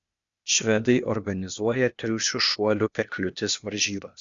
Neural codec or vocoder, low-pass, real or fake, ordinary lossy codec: codec, 16 kHz, 0.8 kbps, ZipCodec; 7.2 kHz; fake; Opus, 64 kbps